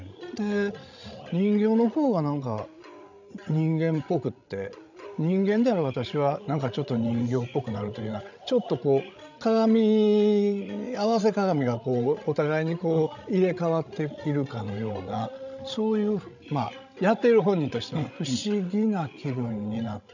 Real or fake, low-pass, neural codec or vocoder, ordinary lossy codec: fake; 7.2 kHz; codec, 16 kHz, 16 kbps, FreqCodec, larger model; none